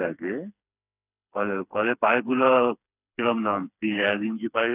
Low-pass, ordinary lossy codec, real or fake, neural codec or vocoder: 3.6 kHz; none; fake; codec, 16 kHz, 4 kbps, FreqCodec, smaller model